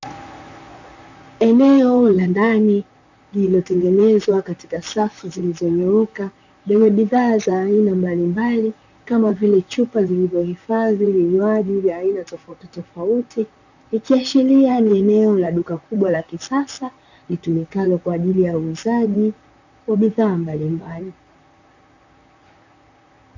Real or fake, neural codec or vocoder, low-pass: fake; vocoder, 44.1 kHz, 128 mel bands, Pupu-Vocoder; 7.2 kHz